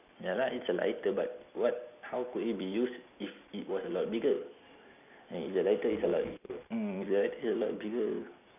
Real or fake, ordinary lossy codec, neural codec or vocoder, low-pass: real; none; none; 3.6 kHz